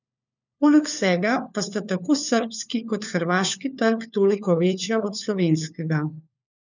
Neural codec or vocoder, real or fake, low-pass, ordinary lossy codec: codec, 16 kHz, 4 kbps, FunCodec, trained on LibriTTS, 50 frames a second; fake; 7.2 kHz; none